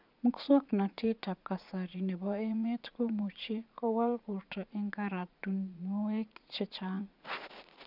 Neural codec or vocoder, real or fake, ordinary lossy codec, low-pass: vocoder, 44.1 kHz, 80 mel bands, Vocos; fake; none; 5.4 kHz